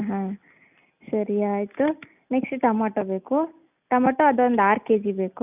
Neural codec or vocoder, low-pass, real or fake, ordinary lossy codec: none; 3.6 kHz; real; none